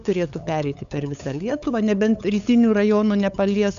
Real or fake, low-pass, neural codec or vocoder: fake; 7.2 kHz; codec, 16 kHz, 8 kbps, FunCodec, trained on LibriTTS, 25 frames a second